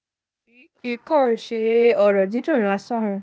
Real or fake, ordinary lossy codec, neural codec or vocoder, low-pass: fake; none; codec, 16 kHz, 0.8 kbps, ZipCodec; none